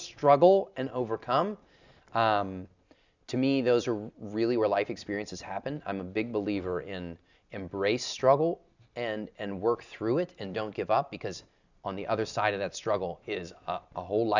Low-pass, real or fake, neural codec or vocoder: 7.2 kHz; real; none